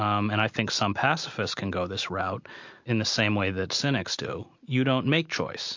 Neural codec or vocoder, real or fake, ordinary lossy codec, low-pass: none; real; MP3, 48 kbps; 7.2 kHz